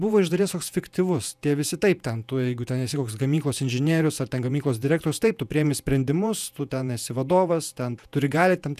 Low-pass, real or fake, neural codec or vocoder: 14.4 kHz; fake; vocoder, 48 kHz, 128 mel bands, Vocos